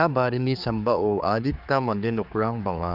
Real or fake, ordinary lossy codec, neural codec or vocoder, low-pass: fake; none; codec, 16 kHz, 4 kbps, X-Codec, HuBERT features, trained on general audio; 5.4 kHz